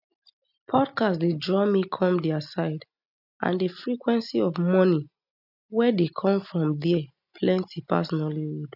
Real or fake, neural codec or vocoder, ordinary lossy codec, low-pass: real; none; none; 5.4 kHz